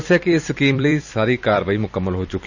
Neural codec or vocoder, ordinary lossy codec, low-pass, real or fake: vocoder, 44.1 kHz, 128 mel bands every 256 samples, BigVGAN v2; none; 7.2 kHz; fake